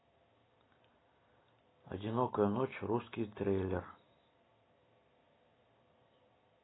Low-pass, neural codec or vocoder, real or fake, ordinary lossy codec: 7.2 kHz; none; real; AAC, 16 kbps